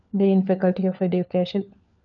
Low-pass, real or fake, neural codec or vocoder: 7.2 kHz; fake; codec, 16 kHz, 4 kbps, FunCodec, trained on LibriTTS, 50 frames a second